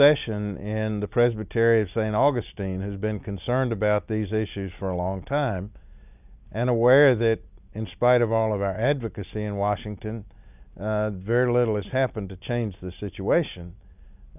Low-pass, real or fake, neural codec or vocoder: 3.6 kHz; real; none